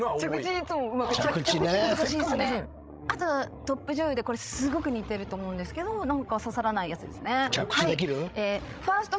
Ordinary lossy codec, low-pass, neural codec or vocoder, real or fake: none; none; codec, 16 kHz, 16 kbps, FreqCodec, larger model; fake